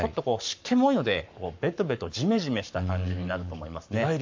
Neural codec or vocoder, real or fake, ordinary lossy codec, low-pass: codec, 44.1 kHz, 7.8 kbps, Pupu-Codec; fake; MP3, 48 kbps; 7.2 kHz